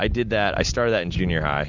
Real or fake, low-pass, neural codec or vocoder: real; 7.2 kHz; none